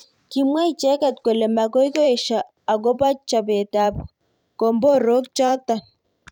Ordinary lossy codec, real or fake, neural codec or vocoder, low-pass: none; fake; vocoder, 44.1 kHz, 128 mel bands every 512 samples, BigVGAN v2; 19.8 kHz